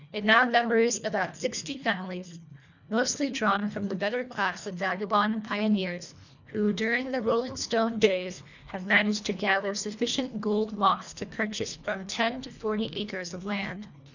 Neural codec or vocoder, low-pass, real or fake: codec, 24 kHz, 1.5 kbps, HILCodec; 7.2 kHz; fake